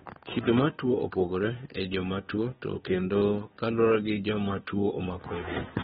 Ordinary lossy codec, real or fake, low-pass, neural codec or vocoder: AAC, 16 kbps; fake; 7.2 kHz; codec, 16 kHz, 2 kbps, FunCodec, trained on Chinese and English, 25 frames a second